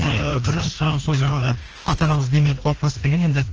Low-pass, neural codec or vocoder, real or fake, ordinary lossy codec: 7.2 kHz; codec, 16 kHz, 1 kbps, FreqCodec, larger model; fake; Opus, 16 kbps